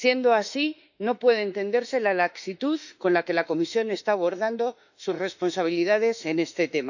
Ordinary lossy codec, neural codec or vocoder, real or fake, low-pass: none; autoencoder, 48 kHz, 32 numbers a frame, DAC-VAE, trained on Japanese speech; fake; 7.2 kHz